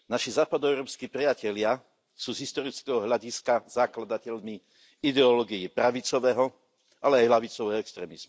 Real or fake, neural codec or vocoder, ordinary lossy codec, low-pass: real; none; none; none